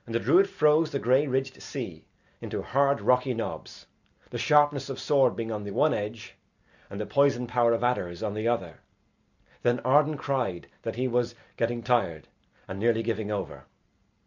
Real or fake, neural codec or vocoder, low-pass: real; none; 7.2 kHz